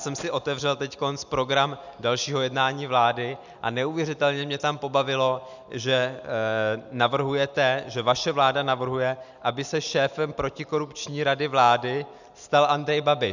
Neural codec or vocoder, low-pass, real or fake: none; 7.2 kHz; real